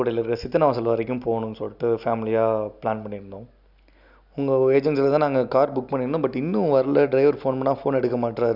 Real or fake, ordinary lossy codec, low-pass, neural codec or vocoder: real; none; 5.4 kHz; none